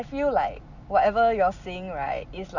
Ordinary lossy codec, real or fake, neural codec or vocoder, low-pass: none; real; none; 7.2 kHz